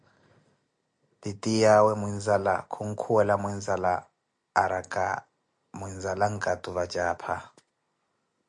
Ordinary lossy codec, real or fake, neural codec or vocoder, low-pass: MP3, 64 kbps; real; none; 10.8 kHz